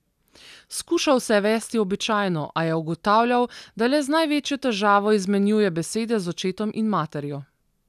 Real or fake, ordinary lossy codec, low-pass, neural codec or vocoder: real; none; 14.4 kHz; none